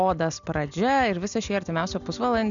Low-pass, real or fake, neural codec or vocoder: 7.2 kHz; real; none